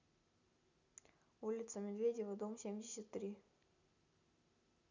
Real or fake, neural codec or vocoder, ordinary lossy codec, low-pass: real; none; none; 7.2 kHz